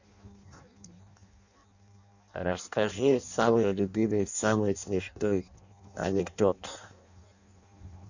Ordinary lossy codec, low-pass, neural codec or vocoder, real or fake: none; 7.2 kHz; codec, 16 kHz in and 24 kHz out, 0.6 kbps, FireRedTTS-2 codec; fake